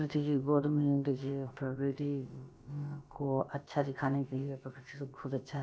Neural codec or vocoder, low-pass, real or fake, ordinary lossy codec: codec, 16 kHz, about 1 kbps, DyCAST, with the encoder's durations; none; fake; none